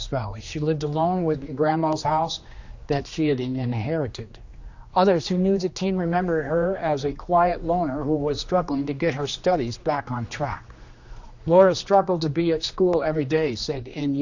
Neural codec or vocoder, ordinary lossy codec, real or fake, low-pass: codec, 16 kHz, 2 kbps, X-Codec, HuBERT features, trained on general audio; Opus, 64 kbps; fake; 7.2 kHz